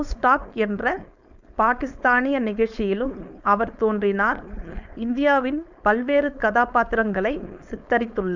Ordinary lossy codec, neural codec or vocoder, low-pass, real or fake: none; codec, 16 kHz, 4.8 kbps, FACodec; 7.2 kHz; fake